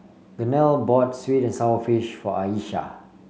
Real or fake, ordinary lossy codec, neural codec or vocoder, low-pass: real; none; none; none